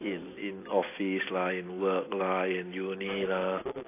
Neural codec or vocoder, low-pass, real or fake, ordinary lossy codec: none; 3.6 kHz; real; AAC, 24 kbps